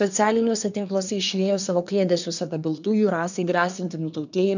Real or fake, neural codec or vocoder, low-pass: fake; codec, 24 kHz, 1 kbps, SNAC; 7.2 kHz